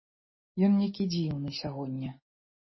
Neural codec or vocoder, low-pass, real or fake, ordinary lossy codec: none; 7.2 kHz; real; MP3, 24 kbps